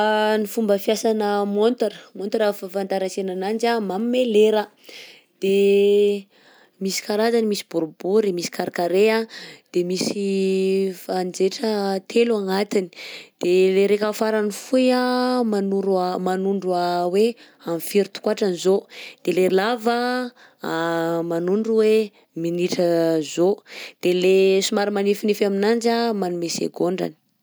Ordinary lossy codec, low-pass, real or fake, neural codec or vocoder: none; none; real; none